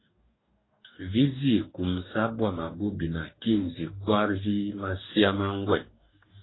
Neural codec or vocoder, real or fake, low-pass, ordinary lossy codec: codec, 44.1 kHz, 2.6 kbps, DAC; fake; 7.2 kHz; AAC, 16 kbps